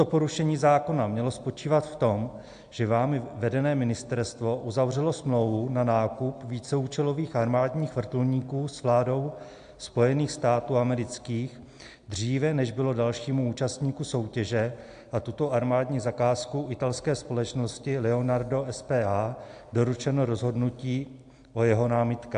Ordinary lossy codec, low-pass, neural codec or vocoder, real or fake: AAC, 64 kbps; 9.9 kHz; none; real